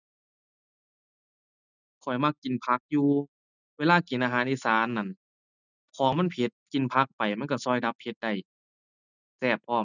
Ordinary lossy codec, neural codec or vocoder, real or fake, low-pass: none; none; real; 7.2 kHz